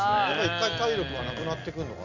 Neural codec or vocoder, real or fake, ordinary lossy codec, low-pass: none; real; none; 7.2 kHz